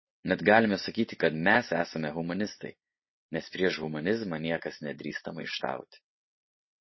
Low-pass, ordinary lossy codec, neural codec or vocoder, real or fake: 7.2 kHz; MP3, 24 kbps; none; real